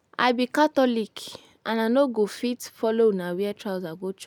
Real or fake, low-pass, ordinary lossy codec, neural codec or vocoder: real; none; none; none